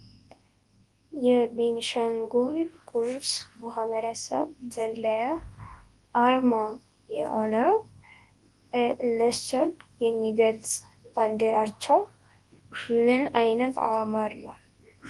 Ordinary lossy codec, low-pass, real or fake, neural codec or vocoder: Opus, 24 kbps; 10.8 kHz; fake; codec, 24 kHz, 0.9 kbps, WavTokenizer, large speech release